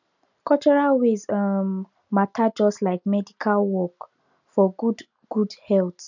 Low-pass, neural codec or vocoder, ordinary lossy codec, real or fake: 7.2 kHz; none; none; real